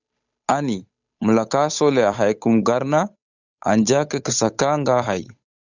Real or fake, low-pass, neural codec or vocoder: fake; 7.2 kHz; codec, 16 kHz, 8 kbps, FunCodec, trained on Chinese and English, 25 frames a second